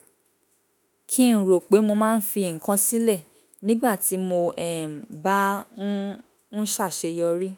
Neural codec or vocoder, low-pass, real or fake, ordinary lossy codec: autoencoder, 48 kHz, 32 numbers a frame, DAC-VAE, trained on Japanese speech; none; fake; none